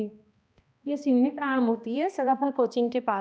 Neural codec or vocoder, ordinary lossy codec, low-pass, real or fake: codec, 16 kHz, 1 kbps, X-Codec, HuBERT features, trained on balanced general audio; none; none; fake